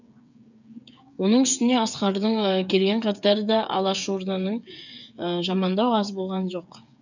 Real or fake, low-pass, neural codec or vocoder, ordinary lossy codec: fake; 7.2 kHz; codec, 16 kHz, 8 kbps, FreqCodec, smaller model; none